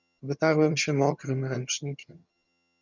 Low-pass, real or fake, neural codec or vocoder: 7.2 kHz; fake; vocoder, 22.05 kHz, 80 mel bands, HiFi-GAN